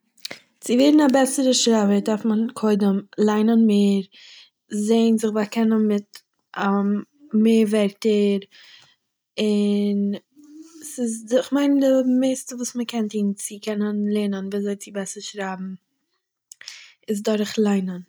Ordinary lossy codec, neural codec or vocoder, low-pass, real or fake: none; none; none; real